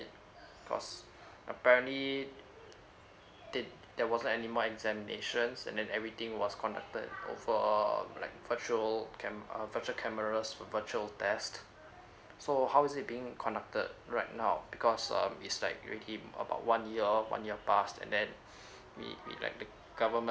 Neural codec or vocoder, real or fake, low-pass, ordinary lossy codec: none; real; none; none